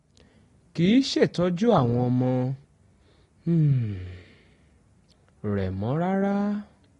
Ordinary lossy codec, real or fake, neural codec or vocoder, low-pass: AAC, 32 kbps; real; none; 10.8 kHz